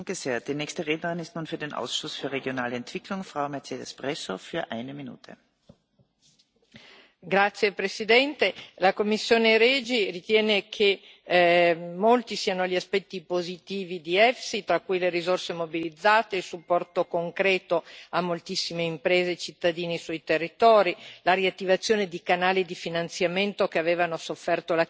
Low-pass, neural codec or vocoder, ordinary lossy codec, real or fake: none; none; none; real